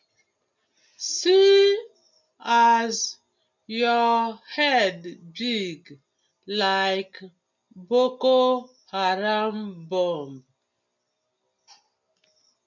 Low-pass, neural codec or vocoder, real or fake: 7.2 kHz; none; real